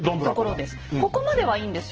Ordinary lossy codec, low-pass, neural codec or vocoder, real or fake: Opus, 16 kbps; 7.2 kHz; none; real